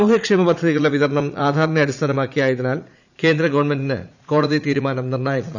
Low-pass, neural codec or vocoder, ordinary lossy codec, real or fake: 7.2 kHz; vocoder, 44.1 kHz, 80 mel bands, Vocos; none; fake